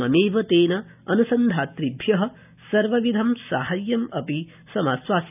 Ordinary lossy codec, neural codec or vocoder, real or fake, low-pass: none; none; real; 3.6 kHz